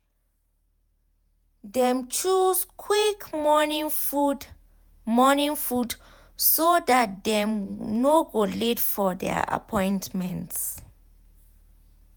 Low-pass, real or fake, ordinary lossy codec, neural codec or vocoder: none; fake; none; vocoder, 48 kHz, 128 mel bands, Vocos